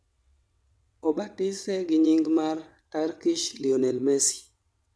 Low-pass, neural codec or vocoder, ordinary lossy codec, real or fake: none; vocoder, 22.05 kHz, 80 mel bands, WaveNeXt; none; fake